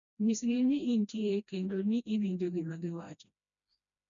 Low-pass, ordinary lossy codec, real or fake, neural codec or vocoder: 7.2 kHz; none; fake; codec, 16 kHz, 1 kbps, FreqCodec, smaller model